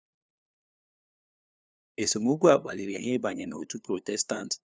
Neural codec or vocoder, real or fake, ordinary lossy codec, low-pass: codec, 16 kHz, 2 kbps, FunCodec, trained on LibriTTS, 25 frames a second; fake; none; none